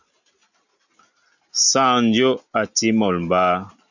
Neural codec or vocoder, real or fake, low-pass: none; real; 7.2 kHz